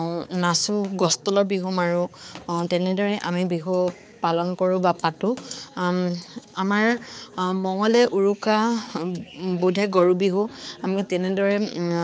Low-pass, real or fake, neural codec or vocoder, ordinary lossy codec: none; fake; codec, 16 kHz, 4 kbps, X-Codec, HuBERT features, trained on balanced general audio; none